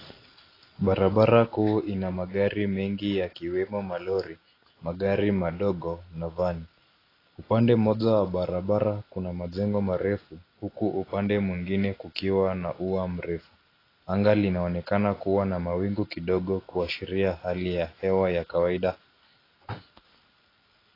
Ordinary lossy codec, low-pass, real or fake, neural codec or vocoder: AAC, 24 kbps; 5.4 kHz; real; none